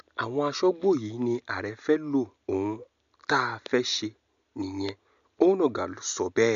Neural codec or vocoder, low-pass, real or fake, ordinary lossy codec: none; 7.2 kHz; real; MP3, 64 kbps